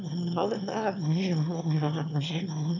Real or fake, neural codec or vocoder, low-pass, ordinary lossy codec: fake; autoencoder, 22.05 kHz, a latent of 192 numbers a frame, VITS, trained on one speaker; 7.2 kHz; none